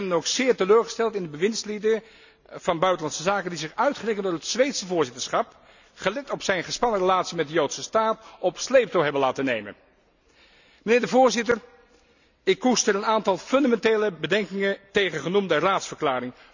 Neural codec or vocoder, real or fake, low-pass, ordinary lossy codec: none; real; 7.2 kHz; none